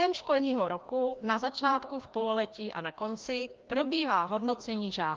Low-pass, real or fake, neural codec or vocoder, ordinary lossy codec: 7.2 kHz; fake; codec, 16 kHz, 1 kbps, FreqCodec, larger model; Opus, 32 kbps